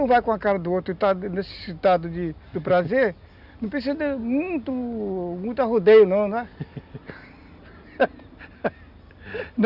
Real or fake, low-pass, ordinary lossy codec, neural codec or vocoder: real; 5.4 kHz; none; none